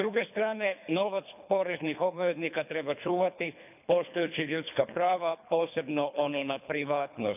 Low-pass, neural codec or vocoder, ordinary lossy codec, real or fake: 3.6 kHz; codec, 24 kHz, 3 kbps, HILCodec; none; fake